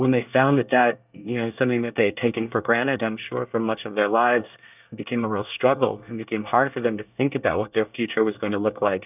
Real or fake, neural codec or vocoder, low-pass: fake; codec, 24 kHz, 1 kbps, SNAC; 3.6 kHz